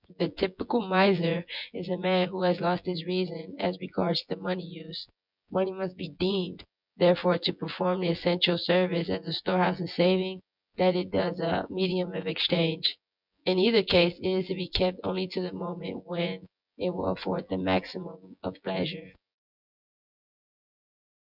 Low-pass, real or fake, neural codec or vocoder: 5.4 kHz; fake; vocoder, 24 kHz, 100 mel bands, Vocos